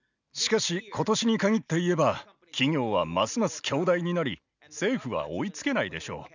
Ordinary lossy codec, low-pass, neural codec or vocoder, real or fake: none; 7.2 kHz; none; real